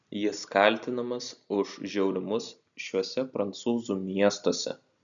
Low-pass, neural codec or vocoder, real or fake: 7.2 kHz; none; real